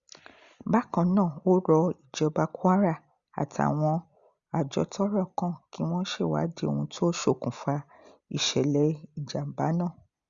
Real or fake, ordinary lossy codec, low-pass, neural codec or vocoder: real; Opus, 64 kbps; 7.2 kHz; none